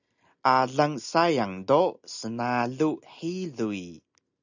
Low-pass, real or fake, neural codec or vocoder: 7.2 kHz; real; none